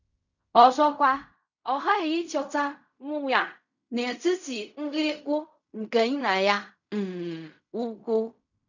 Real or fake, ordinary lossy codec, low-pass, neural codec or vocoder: fake; none; 7.2 kHz; codec, 16 kHz in and 24 kHz out, 0.4 kbps, LongCat-Audio-Codec, fine tuned four codebook decoder